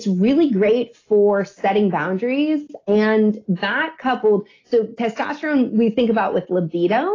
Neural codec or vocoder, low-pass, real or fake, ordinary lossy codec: none; 7.2 kHz; real; AAC, 32 kbps